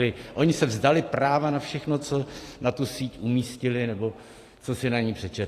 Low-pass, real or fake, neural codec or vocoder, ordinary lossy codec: 14.4 kHz; fake; vocoder, 44.1 kHz, 128 mel bands every 512 samples, BigVGAN v2; AAC, 48 kbps